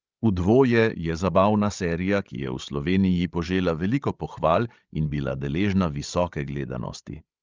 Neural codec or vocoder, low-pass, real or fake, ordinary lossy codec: codec, 16 kHz, 16 kbps, FreqCodec, larger model; 7.2 kHz; fake; Opus, 24 kbps